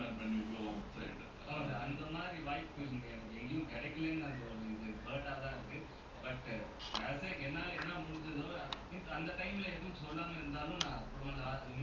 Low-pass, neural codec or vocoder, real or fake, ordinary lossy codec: 7.2 kHz; none; real; Opus, 32 kbps